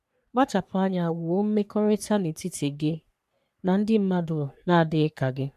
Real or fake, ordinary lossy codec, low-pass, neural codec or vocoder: fake; none; 14.4 kHz; codec, 44.1 kHz, 3.4 kbps, Pupu-Codec